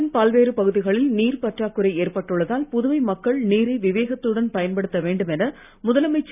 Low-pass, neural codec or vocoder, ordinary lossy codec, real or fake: 3.6 kHz; none; none; real